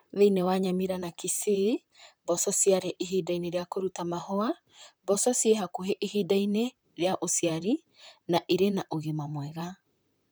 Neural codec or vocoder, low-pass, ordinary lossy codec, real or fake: vocoder, 44.1 kHz, 128 mel bands, Pupu-Vocoder; none; none; fake